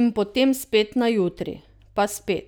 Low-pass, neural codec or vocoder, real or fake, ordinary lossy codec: none; none; real; none